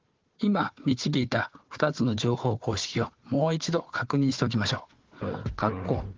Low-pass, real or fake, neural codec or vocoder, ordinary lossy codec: 7.2 kHz; fake; codec, 16 kHz, 4 kbps, FunCodec, trained on Chinese and English, 50 frames a second; Opus, 16 kbps